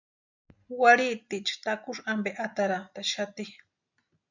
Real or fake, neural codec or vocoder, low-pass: real; none; 7.2 kHz